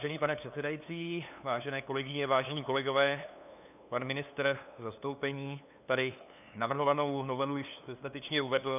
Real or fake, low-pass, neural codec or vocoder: fake; 3.6 kHz; codec, 16 kHz, 2 kbps, FunCodec, trained on LibriTTS, 25 frames a second